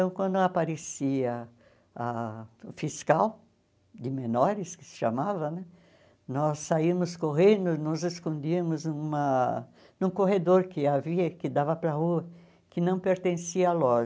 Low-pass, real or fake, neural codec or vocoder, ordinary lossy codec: none; real; none; none